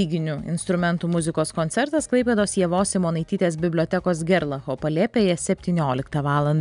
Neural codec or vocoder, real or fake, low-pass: none; real; 10.8 kHz